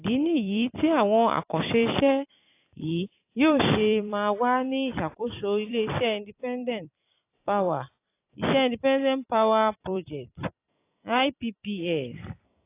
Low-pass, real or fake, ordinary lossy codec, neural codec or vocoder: 3.6 kHz; fake; AAC, 24 kbps; vocoder, 44.1 kHz, 128 mel bands every 256 samples, BigVGAN v2